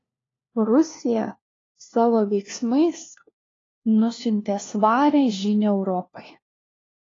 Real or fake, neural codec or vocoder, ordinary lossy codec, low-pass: fake; codec, 16 kHz, 1 kbps, FunCodec, trained on LibriTTS, 50 frames a second; AAC, 32 kbps; 7.2 kHz